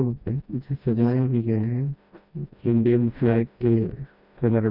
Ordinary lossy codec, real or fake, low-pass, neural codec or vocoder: none; fake; 5.4 kHz; codec, 16 kHz, 1 kbps, FreqCodec, smaller model